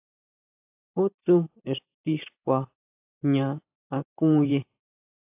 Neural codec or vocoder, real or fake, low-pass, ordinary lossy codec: vocoder, 44.1 kHz, 128 mel bands every 512 samples, BigVGAN v2; fake; 3.6 kHz; AAC, 32 kbps